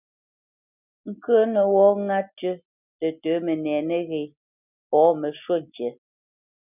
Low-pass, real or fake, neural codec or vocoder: 3.6 kHz; real; none